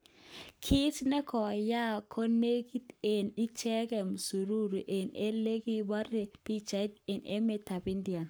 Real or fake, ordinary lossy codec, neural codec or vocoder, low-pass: fake; none; codec, 44.1 kHz, 7.8 kbps, Pupu-Codec; none